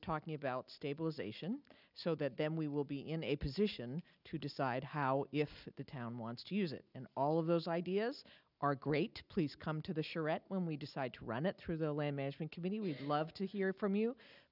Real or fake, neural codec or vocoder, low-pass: real; none; 5.4 kHz